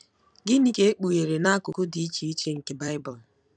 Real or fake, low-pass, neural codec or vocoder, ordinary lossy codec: fake; 9.9 kHz; vocoder, 44.1 kHz, 128 mel bands every 256 samples, BigVGAN v2; none